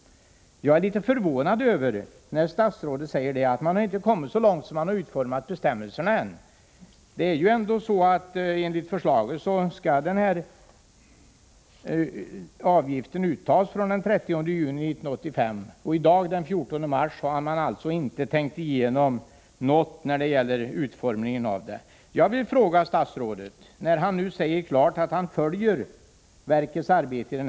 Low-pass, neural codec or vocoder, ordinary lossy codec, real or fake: none; none; none; real